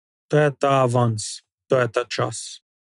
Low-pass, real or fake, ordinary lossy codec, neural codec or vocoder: 10.8 kHz; real; none; none